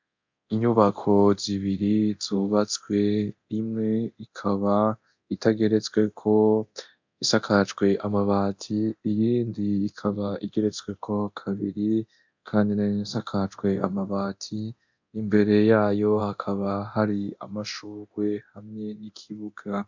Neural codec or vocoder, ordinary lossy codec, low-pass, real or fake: codec, 24 kHz, 0.9 kbps, DualCodec; MP3, 64 kbps; 7.2 kHz; fake